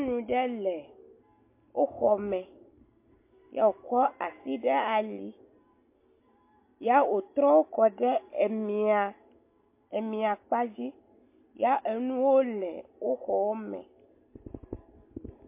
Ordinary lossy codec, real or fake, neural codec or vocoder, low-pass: MP3, 24 kbps; real; none; 3.6 kHz